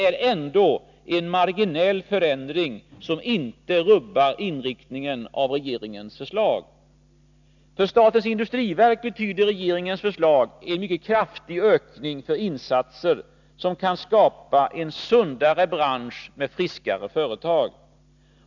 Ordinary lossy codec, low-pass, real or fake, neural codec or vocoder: none; 7.2 kHz; real; none